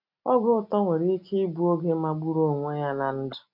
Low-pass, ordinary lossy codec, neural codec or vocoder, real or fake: 5.4 kHz; none; none; real